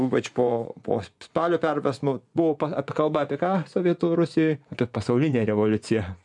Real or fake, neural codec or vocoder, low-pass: fake; vocoder, 24 kHz, 100 mel bands, Vocos; 10.8 kHz